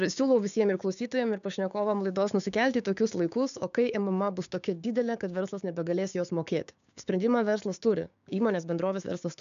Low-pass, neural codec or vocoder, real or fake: 7.2 kHz; codec, 16 kHz, 6 kbps, DAC; fake